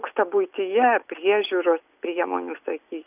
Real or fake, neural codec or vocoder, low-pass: real; none; 3.6 kHz